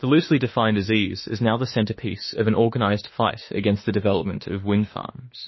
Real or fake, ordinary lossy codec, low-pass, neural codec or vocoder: fake; MP3, 24 kbps; 7.2 kHz; autoencoder, 48 kHz, 32 numbers a frame, DAC-VAE, trained on Japanese speech